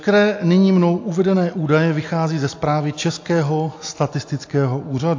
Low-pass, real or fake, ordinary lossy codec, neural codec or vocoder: 7.2 kHz; real; AAC, 48 kbps; none